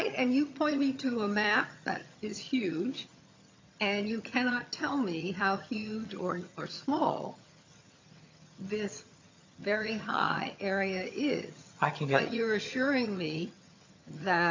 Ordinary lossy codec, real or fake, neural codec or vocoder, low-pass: MP3, 48 kbps; fake; vocoder, 22.05 kHz, 80 mel bands, HiFi-GAN; 7.2 kHz